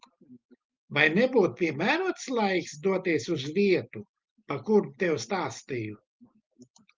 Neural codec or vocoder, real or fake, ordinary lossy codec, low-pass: none; real; Opus, 32 kbps; 7.2 kHz